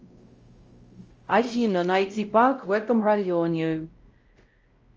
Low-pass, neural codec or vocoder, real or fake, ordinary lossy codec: 7.2 kHz; codec, 16 kHz, 0.5 kbps, X-Codec, WavLM features, trained on Multilingual LibriSpeech; fake; Opus, 24 kbps